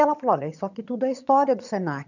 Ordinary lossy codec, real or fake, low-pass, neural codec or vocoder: none; fake; 7.2 kHz; vocoder, 22.05 kHz, 80 mel bands, HiFi-GAN